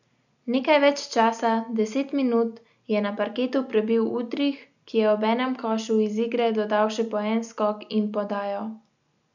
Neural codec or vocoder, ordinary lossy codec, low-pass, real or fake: none; none; 7.2 kHz; real